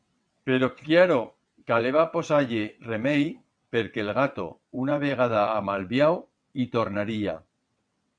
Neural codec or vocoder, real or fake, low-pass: vocoder, 22.05 kHz, 80 mel bands, WaveNeXt; fake; 9.9 kHz